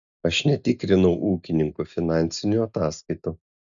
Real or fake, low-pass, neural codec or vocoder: real; 7.2 kHz; none